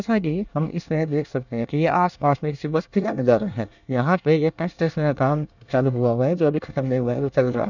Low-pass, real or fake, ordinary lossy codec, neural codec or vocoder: 7.2 kHz; fake; none; codec, 24 kHz, 1 kbps, SNAC